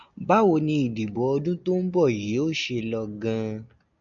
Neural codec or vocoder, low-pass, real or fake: none; 7.2 kHz; real